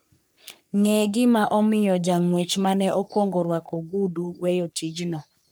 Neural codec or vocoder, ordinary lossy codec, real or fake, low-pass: codec, 44.1 kHz, 3.4 kbps, Pupu-Codec; none; fake; none